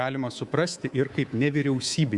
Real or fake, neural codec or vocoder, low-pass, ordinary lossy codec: fake; codec, 24 kHz, 3.1 kbps, DualCodec; 10.8 kHz; Opus, 64 kbps